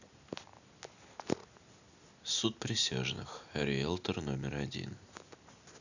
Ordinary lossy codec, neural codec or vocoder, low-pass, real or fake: none; none; 7.2 kHz; real